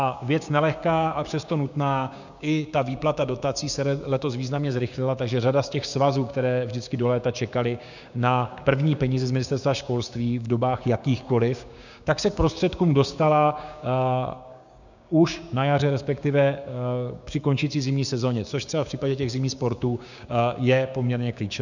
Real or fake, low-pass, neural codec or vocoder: fake; 7.2 kHz; codec, 16 kHz, 6 kbps, DAC